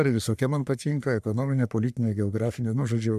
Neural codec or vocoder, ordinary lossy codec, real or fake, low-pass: codec, 44.1 kHz, 3.4 kbps, Pupu-Codec; MP3, 96 kbps; fake; 14.4 kHz